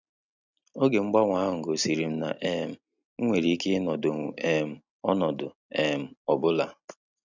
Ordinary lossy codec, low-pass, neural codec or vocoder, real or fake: none; 7.2 kHz; none; real